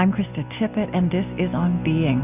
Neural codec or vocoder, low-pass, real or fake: none; 3.6 kHz; real